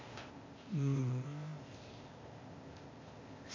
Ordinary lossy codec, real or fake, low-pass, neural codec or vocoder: MP3, 48 kbps; fake; 7.2 kHz; codec, 16 kHz, 0.8 kbps, ZipCodec